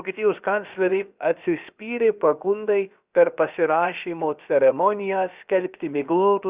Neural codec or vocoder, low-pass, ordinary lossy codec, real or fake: codec, 16 kHz, about 1 kbps, DyCAST, with the encoder's durations; 3.6 kHz; Opus, 64 kbps; fake